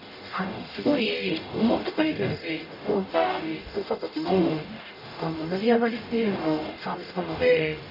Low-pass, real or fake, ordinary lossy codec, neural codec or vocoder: 5.4 kHz; fake; none; codec, 44.1 kHz, 0.9 kbps, DAC